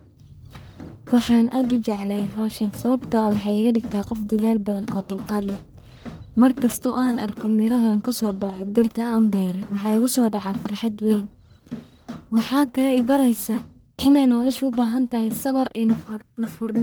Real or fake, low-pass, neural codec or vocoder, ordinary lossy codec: fake; none; codec, 44.1 kHz, 1.7 kbps, Pupu-Codec; none